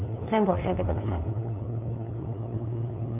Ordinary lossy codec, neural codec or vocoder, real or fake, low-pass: none; codec, 16 kHz, 2 kbps, FunCodec, trained on LibriTTS, 25 frames a second; fake; 3.6 kHz